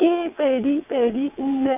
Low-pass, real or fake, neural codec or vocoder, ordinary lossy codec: 3.6 kHz; fake; vocoder, 22.05 kHz, 80 mel bands, WaveNeXt; none